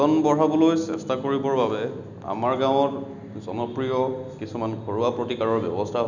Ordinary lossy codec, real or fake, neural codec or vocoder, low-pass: none; real; none; 7.2 kHz